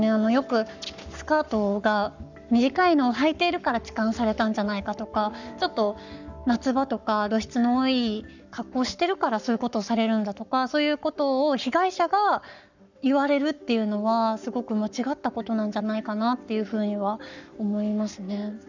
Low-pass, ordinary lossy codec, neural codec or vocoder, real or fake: 7.2 kHz; none; codec, 44.1 kHz, 7.8 kbps, Pupu-Codec; fake